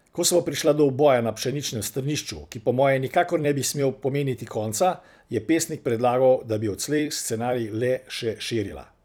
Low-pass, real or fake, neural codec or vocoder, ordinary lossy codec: none; fake; vocoder, 44.1 kHz, 128 mel bands every 256 samples, BigVGAN v2; none